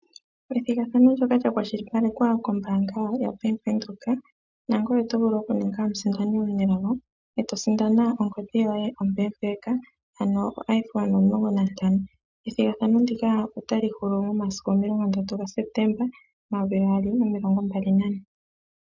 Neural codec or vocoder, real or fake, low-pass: none; real; 7.2 kHz